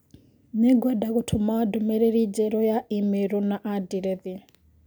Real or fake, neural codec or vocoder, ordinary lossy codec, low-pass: real; none; none; none